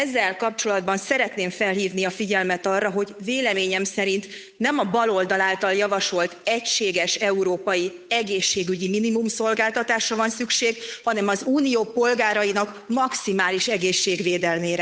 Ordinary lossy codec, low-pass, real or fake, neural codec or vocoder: none; none; fake; codec, 16 kHz, 8 kbps, FunCodec, trained on Chinese and English, 25 frames a second